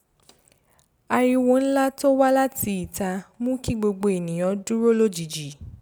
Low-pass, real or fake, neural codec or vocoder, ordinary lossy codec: none; real; none; none